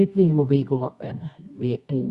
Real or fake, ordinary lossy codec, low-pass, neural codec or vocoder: fake; Opus, 64 kbps; 10.8 kHz; codec, 24 kHz, 0.9 kbps, WavTokenizer, medium music audio release